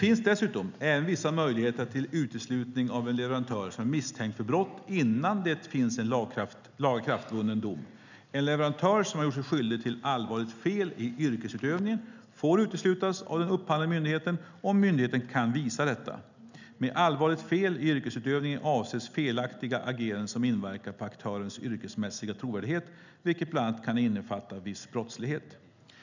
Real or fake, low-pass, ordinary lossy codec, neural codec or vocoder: real; 7.2 kHz; none; none